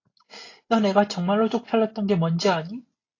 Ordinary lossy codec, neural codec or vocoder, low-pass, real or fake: AAC, 32 kbps; none; 7.2 kHz; real